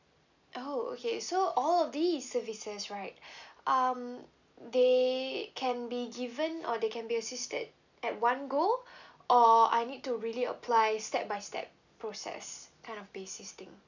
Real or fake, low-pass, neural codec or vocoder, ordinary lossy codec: real; 7.2 kHz; none; none